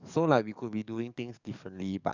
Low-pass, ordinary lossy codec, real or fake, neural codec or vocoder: 7.2 kHz; none; fake; codec, 44.1 kHz, 7.8 kbps, DAC